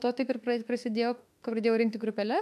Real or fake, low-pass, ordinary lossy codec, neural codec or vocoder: fake; 14.4 kHz; MP3, 96 kbps; autoencoder, 48 kHz, 32 numbers a frame, DAC-VAE, trained on Japanese speech